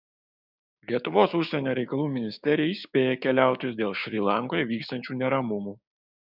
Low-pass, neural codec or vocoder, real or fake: 5.4 kHz; codec, 16 kHz, 6 kbps, DAC; fake